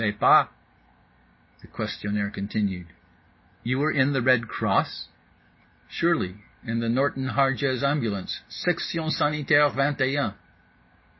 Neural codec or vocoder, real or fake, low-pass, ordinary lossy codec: none; real; 7.2 kHz; MP3, 24 kbps